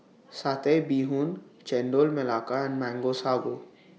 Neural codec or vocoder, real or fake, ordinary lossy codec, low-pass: none; real; none; none